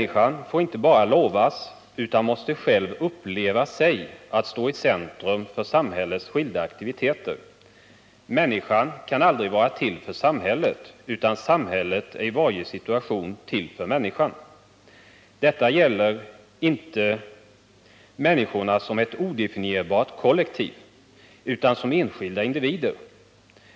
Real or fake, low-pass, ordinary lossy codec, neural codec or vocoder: real; none; none; none